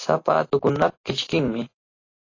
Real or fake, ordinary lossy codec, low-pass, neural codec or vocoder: real; AAC, 32 kbps; 7.2 kHz; none